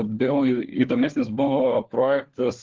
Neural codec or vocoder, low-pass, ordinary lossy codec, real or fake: codec, 16 kHz, 4 kbps, FunCodec, trained on LibriTTS, 50 frames a second; 7.2 kHz; Opus, 16 kbps; fake